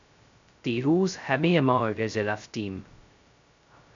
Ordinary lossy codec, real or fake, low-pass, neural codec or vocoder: AAC, 64 kbps; fake; 7.2 kHz; codec, 16 kHz, 0.2 kbps, FocalCodec